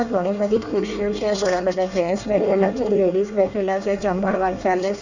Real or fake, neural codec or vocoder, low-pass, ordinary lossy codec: fake; codec, 24 kHz, 1 kbps, SNAC; 7.2 kHz; none